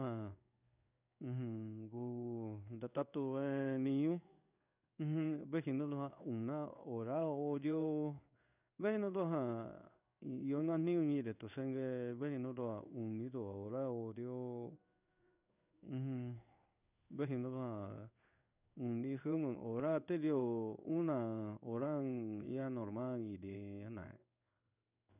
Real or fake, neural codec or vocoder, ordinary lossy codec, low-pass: fake; codec, 16 kHz in and 24 kHz out, 1 kbps, XY-Tokenizer; none; 3.6 kHz